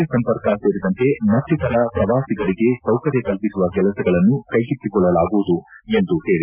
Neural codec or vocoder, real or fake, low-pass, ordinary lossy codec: none; real; 3.6 kHz; none